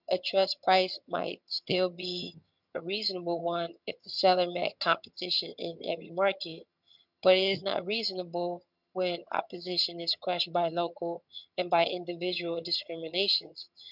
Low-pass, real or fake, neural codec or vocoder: 5.4 kHz; fake; vocoder, 22.05 kHz, 80 mel bands, HiFi-GAN